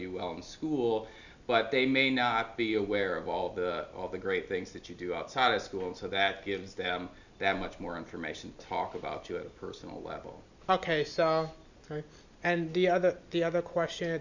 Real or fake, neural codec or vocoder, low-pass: real; none; 7.2 kHz